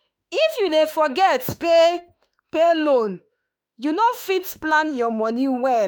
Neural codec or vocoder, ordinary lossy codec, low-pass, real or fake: autoencoder, 48 kHz, 32 numbers a frame, DAC-VAE, trained on Japanese speech; none; none; fake